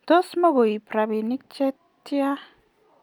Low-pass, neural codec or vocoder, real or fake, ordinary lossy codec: 19.8 kHz; none; real; none